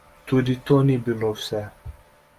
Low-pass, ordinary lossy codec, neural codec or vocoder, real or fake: 14.4 kHz; Opus, 32 kbps; none; real